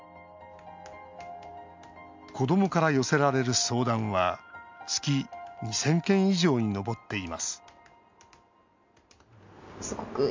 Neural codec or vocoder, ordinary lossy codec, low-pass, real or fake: none; MP3, 64 kbps; 7.2 kHz; real